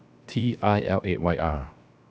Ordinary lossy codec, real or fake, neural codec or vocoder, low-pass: none; fake; codec, 16 kHz, 0.7 kbps, FocalCodec; none